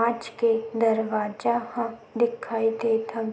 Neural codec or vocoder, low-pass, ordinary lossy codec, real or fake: none; none; none; real